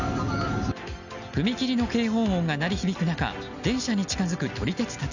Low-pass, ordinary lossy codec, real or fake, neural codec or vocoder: 7.2 kHz; none; real; none